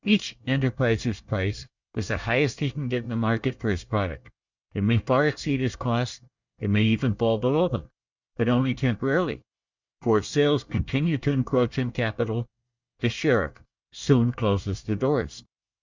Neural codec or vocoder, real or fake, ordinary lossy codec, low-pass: codec, 24 kHz, 1 kbps, SNAC; fake; Opus, 64 kbps; 7.2 kHz